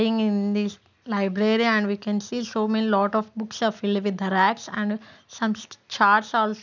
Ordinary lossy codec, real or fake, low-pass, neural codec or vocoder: none; real; 7.2 kHz; none